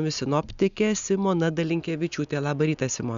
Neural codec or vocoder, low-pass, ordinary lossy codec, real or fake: none; 7.2 kHz; Opus, 64 kbps; real